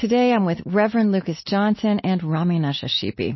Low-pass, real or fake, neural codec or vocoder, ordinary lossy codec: 7.2 kHz; real; none; MP3, 24 kbps